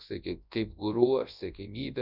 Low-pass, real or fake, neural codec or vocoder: 5.4 kHz; fake; codec, 24 kHz, 0.9 kbps, WavTokenizer, large speech release